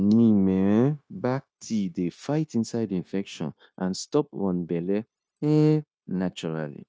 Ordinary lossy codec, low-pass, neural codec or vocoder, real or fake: none; none; codec, 16 kHz, 0.9 kbps, LongCat-Audio-Codec; fake